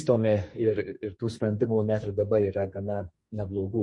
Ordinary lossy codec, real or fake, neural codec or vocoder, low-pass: MP3, 48 kbps; fake; codec, 44.1 kHz, 2.6 kbps, SNAC; 10.8 kHz